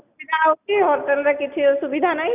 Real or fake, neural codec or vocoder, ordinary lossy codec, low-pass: real; none; none; 3.6 kHz